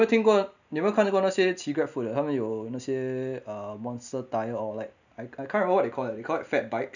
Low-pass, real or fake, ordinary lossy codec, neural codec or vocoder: 7.2 kHz; real; none; none